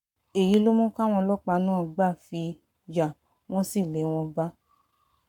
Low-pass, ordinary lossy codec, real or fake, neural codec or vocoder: 19.8 kHz; none; fake; codec, 44.1 kHz, 7.8 kbps, Pupu-Codec